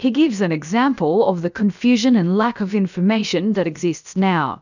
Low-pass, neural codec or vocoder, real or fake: 7.2 kHz; codec, 16 kHz, about 1 kbps, DyCAST, with the encoder's durations; fake